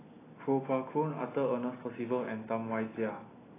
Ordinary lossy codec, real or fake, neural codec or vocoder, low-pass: AAC, 16 kbps; real; none; 3.6 kHz